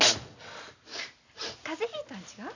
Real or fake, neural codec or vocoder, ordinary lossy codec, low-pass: real; none; none; 7.2 kHz